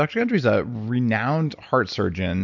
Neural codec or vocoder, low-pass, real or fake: none; 7.2 kHz; real